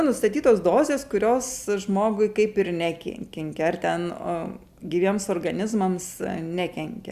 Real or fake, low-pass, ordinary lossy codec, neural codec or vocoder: real; 14.4 kHz; Opus, 64 kbps; none